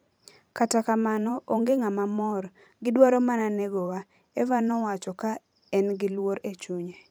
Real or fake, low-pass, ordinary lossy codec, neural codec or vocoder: fake; none; none; vocoder, 44.1 kHz, 128 mel bands every 512 samples, BigVGAN v2